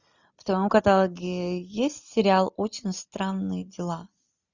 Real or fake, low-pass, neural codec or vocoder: real; 7.2 kHz; none